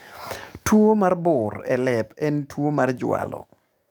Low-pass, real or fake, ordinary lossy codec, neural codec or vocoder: none; fake; none; codec, 44.1 kHz, 7.8 kbps, DAC